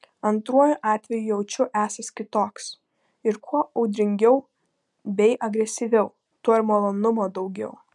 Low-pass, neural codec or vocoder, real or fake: 10.8 kHz; none; real